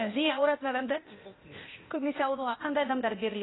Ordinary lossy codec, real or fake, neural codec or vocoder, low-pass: AAC, 16 kbps; fake; codec, 16 kHz, 0.8 kbps, ZipCodec; 7.2 kHz